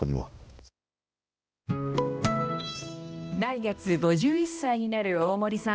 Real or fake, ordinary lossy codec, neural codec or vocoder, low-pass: fake; none; codec, 16 kHz, 1 kbps, X-Codec, HuBERT features, trained on balanced general audio; none